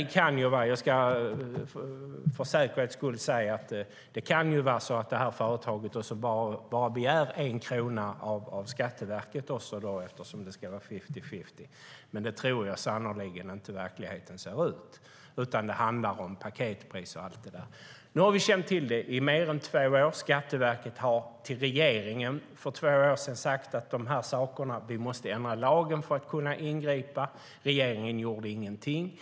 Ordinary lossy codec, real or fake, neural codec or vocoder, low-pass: none; real; none; none